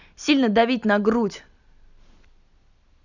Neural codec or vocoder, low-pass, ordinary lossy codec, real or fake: none; 7.2 kHz; none; real